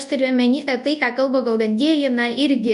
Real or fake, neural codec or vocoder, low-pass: fake; codec, 24 kHz, 0.9 kbps, WavTokenizer, large speech release; 10.8 kHz